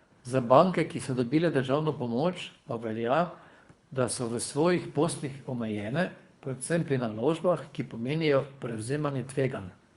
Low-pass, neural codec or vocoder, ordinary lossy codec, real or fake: 10.8 kHz; codec, 24 kHz, 3 kbps, HILCodec; Opus, 64 kbps; fake